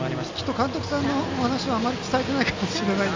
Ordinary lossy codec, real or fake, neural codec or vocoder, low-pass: MP3, 48 kbps; real; none; 7.2 kHz